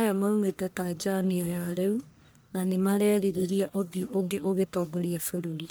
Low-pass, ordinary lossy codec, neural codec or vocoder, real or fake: none; none; codec, 44.1 kHz, 1.7 kbps, Pupu-Codec; fake